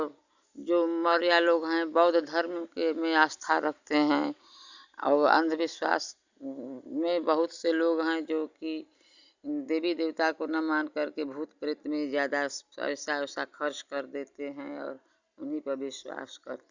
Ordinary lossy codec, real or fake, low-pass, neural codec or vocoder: Opus, 64 kbps; real; 7.2 kHz; none